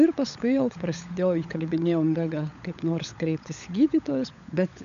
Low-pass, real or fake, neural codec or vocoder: 7.2 kHz; fake; codec, 16 kHz, 16 kbps, FunCodec, trained on LibriTTS, 50 frames a second